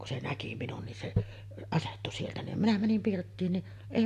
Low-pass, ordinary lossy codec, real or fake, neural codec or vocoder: 14.4 kHz; AAC, 64 kbps; real; none